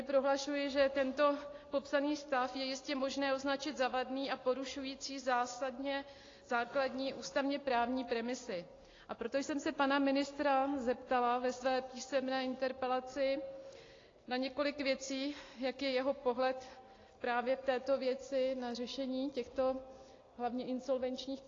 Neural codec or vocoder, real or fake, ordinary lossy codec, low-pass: none; real; AAC, 32 kbps; 7.2 kHz